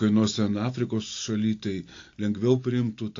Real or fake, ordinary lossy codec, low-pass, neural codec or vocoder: real; AAC, 48 kbps; 7.2 kHz; none